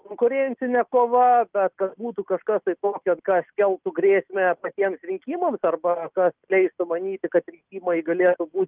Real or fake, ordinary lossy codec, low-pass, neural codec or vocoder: real; Opus, 32 kbps; 3.6 kHz; none